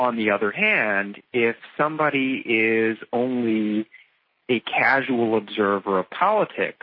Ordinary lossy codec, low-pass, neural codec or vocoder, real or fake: MP3, 24 kbps; 5.4 kHz; none; real